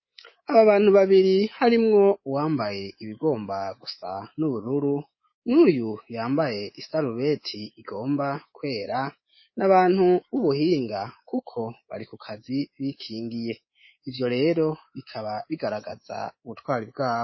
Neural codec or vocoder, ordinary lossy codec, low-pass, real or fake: codec, 24 kHz, 3.1 kbps, DualCodec; MP3, 24 kbps; 7.2 kHz; fake